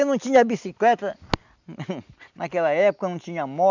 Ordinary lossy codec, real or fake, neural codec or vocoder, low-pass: none; real; none; 7.2 kHz